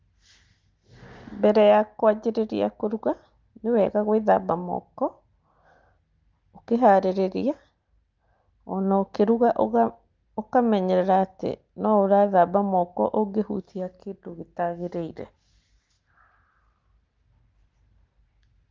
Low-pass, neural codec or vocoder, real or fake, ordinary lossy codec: 7.2 kHz; none; real; Opus, 32 kbps